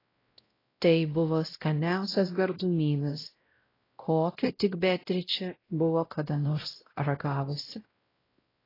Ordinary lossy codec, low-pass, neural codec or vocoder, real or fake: AAC, 24 kbps; 5.4 kHz; codec, 16 kHz, 0.5 kbps, X-Codec, WavLM features, trained on Multilingual LibriSpeech; fake